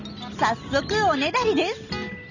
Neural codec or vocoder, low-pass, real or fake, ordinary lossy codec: none; 7.2 kHz; real; none